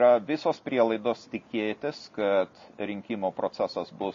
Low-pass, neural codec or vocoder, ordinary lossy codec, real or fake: 7.2 kHz; none; MP3, 32 kbps; real